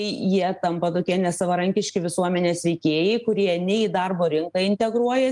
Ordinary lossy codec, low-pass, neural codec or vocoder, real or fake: Opus, 32 kbps; 10.8 kHz; none; real